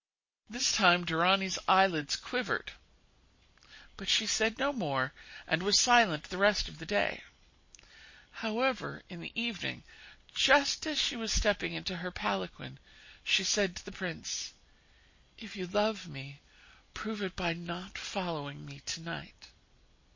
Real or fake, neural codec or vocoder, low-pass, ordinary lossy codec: real; none; 7.2 kHz; MP3, 32 kbps